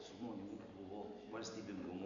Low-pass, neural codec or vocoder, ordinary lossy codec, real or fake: 7.2 kHz; none; MP3, 96 kbps; real